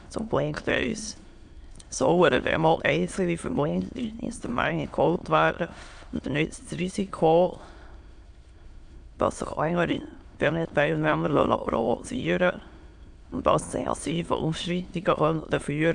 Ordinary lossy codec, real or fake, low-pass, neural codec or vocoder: AAC, 64 kbps; fake; 9.9 kHz; autoencoder, 22.05 kHz, a latent of 192 numbers a frame, VITS, trained on many speakers